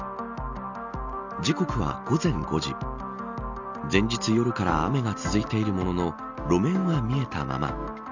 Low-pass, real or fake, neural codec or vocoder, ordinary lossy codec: 7.2 kHz; real; none; none